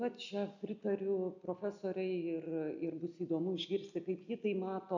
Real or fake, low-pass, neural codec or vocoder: real; 7.2 kHz; none